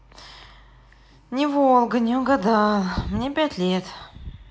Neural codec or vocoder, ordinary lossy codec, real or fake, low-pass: none; none; real; none